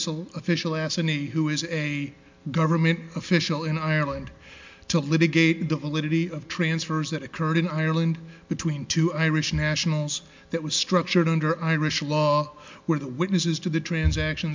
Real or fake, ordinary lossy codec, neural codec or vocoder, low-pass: real; MP3, 64 kbps; none; 7.2 kHz